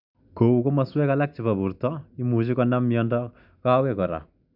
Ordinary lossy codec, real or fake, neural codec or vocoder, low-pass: none; real; none; 5.4 kHz